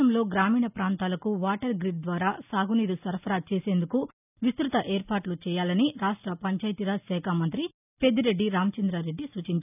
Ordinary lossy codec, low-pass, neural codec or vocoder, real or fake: none; 3.6 kHz; none; real